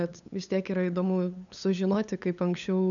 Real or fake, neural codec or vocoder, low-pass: fake; codec, 16 kHz, 8 kbps, FunCodec, trained on LibriTTS, 25 frames a second; 7.2 kHz